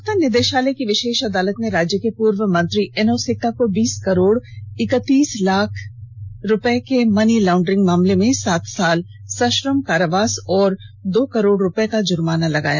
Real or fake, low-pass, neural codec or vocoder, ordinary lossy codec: real; none; none; none